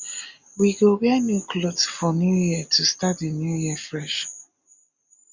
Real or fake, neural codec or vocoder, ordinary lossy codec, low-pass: real; none; Opus, 64 kbps; 7.2 kHz